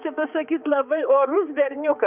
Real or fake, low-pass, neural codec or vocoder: fake; 3.6 kHz; codec, 16 kHz, 4 kbps, X-Codec, HuBERT features, trained on balanced general audio